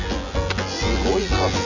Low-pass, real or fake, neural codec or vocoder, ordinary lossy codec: 7.2 kHz; fake; vocoder, 24 kHz, 100 mel bands, Vocos; none